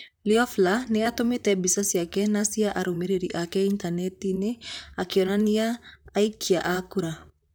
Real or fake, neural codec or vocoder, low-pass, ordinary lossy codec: fake; vocoder, 44.1 kHz, 128 mel bands, Pupu-Vocoder; none; none